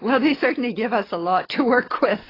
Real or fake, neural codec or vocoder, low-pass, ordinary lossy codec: fake; codec, 44.1 kHz, 7.8 kbps, DAC; 5.4 kHz; AAC, 24 kbps